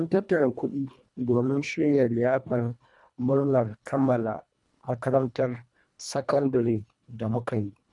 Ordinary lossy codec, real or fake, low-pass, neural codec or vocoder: none; fake; 10.8 kHz; codec, 24 kHz, 1.5 kbps, HILCodec